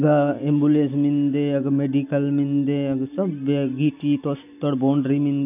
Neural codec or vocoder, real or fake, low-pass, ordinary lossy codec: none; real; 3.6 kHz; none